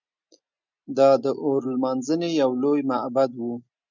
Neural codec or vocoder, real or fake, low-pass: none; real; 7.2 kHz